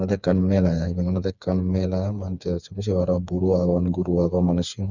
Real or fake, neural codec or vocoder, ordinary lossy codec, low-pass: fake; codec, 16 kHz, 4 kbps, FreqCodec, smaller model; none; 7.2 kHz